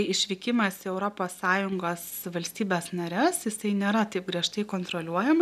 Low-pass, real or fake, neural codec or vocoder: 14.4 kHz; real; none